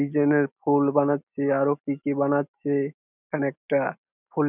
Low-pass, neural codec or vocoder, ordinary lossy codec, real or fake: 3.6 kHz; none; none; real